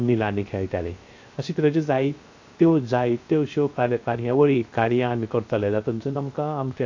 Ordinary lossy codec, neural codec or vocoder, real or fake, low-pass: AAC, 48 kbps; codec, 16 kHz, 0.3 kbps, FocalCodec; fake; 7.2 kHz